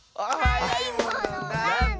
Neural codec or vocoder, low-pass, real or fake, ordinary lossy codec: none; none; real; none